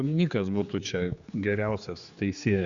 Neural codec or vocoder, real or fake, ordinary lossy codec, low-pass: codec, 16 kHz, 4 kbps, X-Codec, HuBERT features, trained on general audio; fake; Opus, 64 kbps; 7.2 kHz